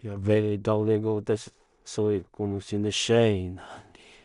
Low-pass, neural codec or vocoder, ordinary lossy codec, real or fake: 10.8 kHz; codec, 16 kHz in and 24 kHz out, 0.4 kbps, LongCat-Audio-Codec, two codebook decoder; none; fake